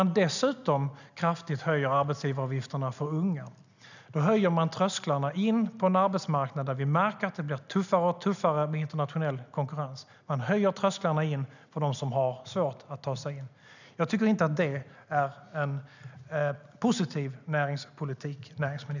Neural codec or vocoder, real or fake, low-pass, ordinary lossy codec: none; real; 7.2 kHz; none